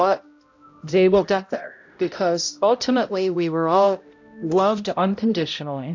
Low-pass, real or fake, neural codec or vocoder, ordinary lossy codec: 7.2 kHz; fake; codec, 16 kHz, 0.5 kbps, X-Codec, HuBERT features, trained on balanced general audio; AAC, 48 kbps